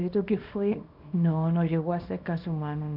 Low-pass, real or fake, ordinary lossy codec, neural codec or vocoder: 5.4 kHz; fake; none; codec, 24 kHz, 0.9 kbps, WavTokenizer, small release